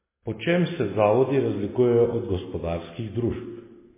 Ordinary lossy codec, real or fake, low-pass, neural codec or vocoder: MP3, 16 kbps; real; 3.6 kHz; none